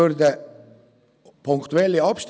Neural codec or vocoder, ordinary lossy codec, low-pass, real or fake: none; none; none; real